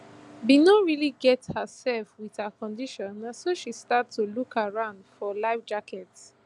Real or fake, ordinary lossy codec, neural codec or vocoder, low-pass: real; none; none; 10.8 kHz